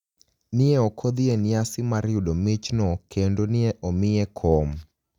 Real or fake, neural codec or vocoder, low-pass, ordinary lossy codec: real; none; 19.8 kHz; none